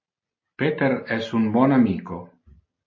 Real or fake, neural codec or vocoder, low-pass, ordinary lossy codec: real; none; 7.2 kHz; MP3, 32 kbps